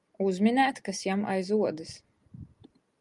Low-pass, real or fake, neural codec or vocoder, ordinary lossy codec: 10.8 kHz; real; none; Opus, 32 kbps